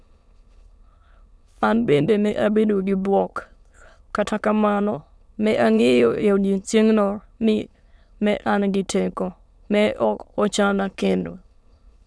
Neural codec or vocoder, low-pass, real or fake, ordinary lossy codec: autoencoder, 22.05 kHz, a latent of 192 numbers a frame, VITS, trained on many speakers; none; fake; none